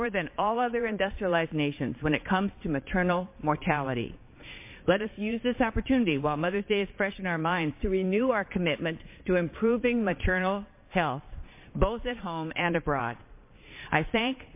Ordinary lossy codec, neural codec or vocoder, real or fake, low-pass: MP3, 24 kbps; vocoder, 22.05 kHz, 80 mel bands, Vocos; fake; 3.6 kHz